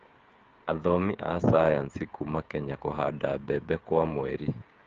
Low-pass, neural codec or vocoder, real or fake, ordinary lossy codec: 7.2 kHz; codec, 16 kHz, 8 kbps, FreqCodec, smaller model; fake; Opus, 32 kbps